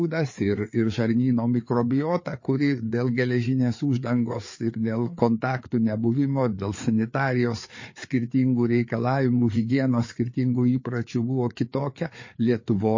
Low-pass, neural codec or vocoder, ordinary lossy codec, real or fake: 7.2 kHz; codec, 16 kHz, 4 kbps, FreqCodec, larger model; MP3, 32 kbps; fake